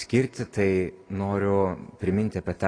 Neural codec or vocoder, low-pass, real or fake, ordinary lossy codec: none; 9.9 kHz; real; AAC, 32 kbps